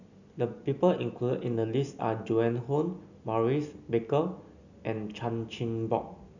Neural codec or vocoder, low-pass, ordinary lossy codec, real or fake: none; 7.2 kHz; none; real